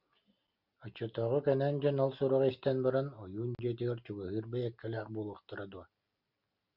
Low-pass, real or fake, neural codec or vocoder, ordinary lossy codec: 5.4 kHz; real; none; Opus, 64 kbps